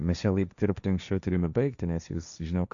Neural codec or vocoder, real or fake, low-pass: codec, 16 kHz, 1.1 kbps, Voila-Tokenizer; fake; 7.2 kHz